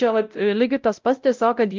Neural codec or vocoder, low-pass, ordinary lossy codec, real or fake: codec, 16 kHz, 0.5 kbps, X-Codec, WavLM features, trained on Multilingual LibriSpeech; 7.2 kHz; Opus, 32 kbps; fake